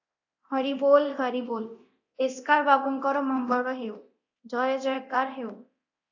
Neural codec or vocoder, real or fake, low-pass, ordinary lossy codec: codec, 24 kHz, 0.9 kbps, DualCodec; fake; 7.2 kHz; AAC, 48 kbps